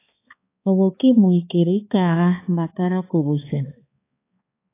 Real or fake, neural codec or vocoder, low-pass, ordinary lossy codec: fake; codec, 16 kHz, 2 kbps, X-Codec, HuBERT features, trained on balanced general audio; 3.6 kHz; AAC, 24 kbps